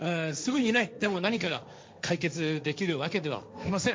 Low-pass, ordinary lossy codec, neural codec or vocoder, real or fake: none; none; codec, 16 kHz, 1.1 kbps, Voila-Tokenizer; fake